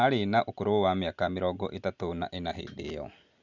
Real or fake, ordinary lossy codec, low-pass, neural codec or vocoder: real; none; 7.2 kHz; none